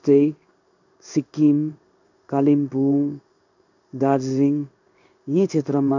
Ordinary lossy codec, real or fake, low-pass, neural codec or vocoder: none; fake; 7.2 kHz; codec, 16 kHz in and 24 kHz out, 1 kbps, XY-Tokenizer